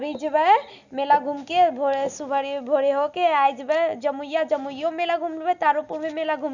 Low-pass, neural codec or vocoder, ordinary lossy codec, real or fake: 7.2 kHz; none; none; real